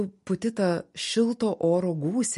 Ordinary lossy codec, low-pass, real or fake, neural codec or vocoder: MP3, 48 kbps; 14.4 kHz; real; none